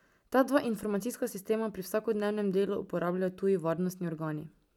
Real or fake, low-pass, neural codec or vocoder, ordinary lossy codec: real; 19.8 kHz; none; none